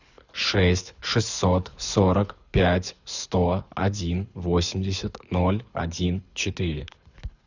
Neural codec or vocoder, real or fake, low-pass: codec, 24 kHz, 6 kbps, HILCodec; fake; 7.2 kHz